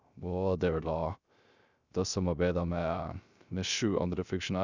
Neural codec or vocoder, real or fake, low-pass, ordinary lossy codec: codec, 16 kHz, 0.3 kbps, FocalCodec; fake; 7.2 kHz; Opus, 64 kbps